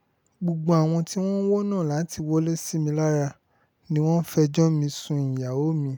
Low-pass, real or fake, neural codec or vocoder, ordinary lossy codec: none; real; none; none